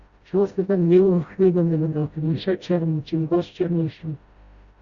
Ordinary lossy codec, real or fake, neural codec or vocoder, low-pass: Opus, 64 kbps; fake; codec, 16 kHz, 0.5 kbps, FreqCodec, smaller model; 7.2 kHz